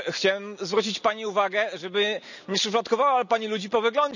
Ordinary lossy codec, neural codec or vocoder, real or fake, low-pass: none; none; real; 7.2 kHz